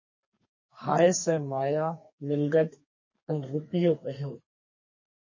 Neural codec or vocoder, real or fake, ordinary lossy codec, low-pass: codec, 32 kHz, 1.9 kbps, SNAC; fake; MP3, 32 kbps; 7.2 kHz